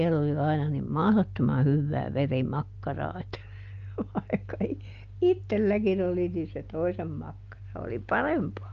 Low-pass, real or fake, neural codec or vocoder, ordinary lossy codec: 7.2 kHz; real; none; Opus, 24 kbps